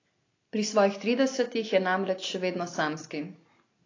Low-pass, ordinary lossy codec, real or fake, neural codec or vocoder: 7.2 kHz; AAC, 32 kbps; real; none